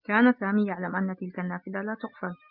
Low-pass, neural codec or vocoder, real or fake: 5.4 kHz; none; real